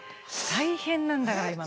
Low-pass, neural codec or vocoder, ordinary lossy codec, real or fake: none; none; none; real